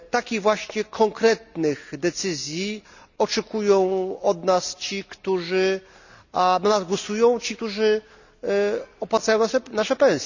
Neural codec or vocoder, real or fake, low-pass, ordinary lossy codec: none; real; 7.2 kHz; none